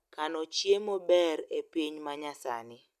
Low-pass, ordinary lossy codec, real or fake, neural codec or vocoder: 14.4 kHz; none; real; none